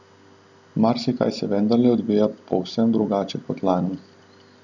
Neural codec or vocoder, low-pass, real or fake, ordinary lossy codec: none; 7.2 kHz; real; none